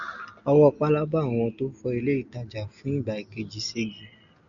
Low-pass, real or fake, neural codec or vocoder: 7.2 kHz; real; none